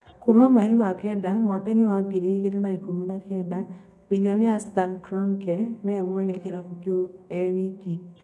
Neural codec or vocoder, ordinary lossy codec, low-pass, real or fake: codec, 24 kHz, 0.9 kbps, WavTokenizer, medium music audio release; none; none; fake